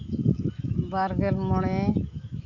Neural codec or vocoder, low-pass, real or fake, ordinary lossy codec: autoencoder, 48 kHz, 128 numbers a frame, DAC-VAE, trained on Japanese speech; 7.2 kHz; fake; MP3, 48 kbps